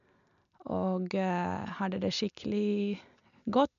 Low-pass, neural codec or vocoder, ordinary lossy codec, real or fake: 7.2 kHz; none; none; real